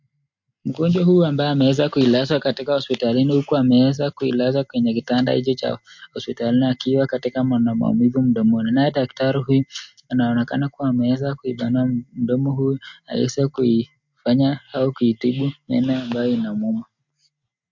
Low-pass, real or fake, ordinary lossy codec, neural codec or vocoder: 7.2 kHz; real; MP3, 48 kbps; none